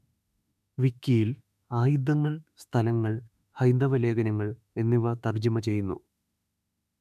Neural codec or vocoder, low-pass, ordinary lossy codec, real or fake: autoencoder, 48 kHz, 32 numbers a frame, DAC-VAE, trained on Japanese speech; 14.4 kHz; none; fake